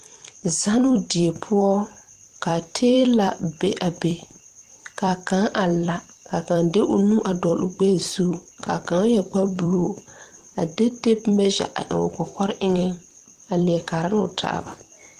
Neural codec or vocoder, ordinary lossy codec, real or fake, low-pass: vocoder, 44.1 kHz, 128 mel bands every 256 samples, BigVGAN v2; Opus, 24 kbps; fake; 14.4 kHz